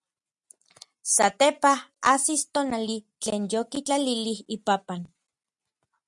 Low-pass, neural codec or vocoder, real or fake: 10.8 kHz; none; real